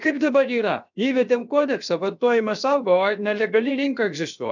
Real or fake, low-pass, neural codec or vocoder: fake; 7.2 kHz; codec, 16 kHz, 0.7 kbps, FocalCodec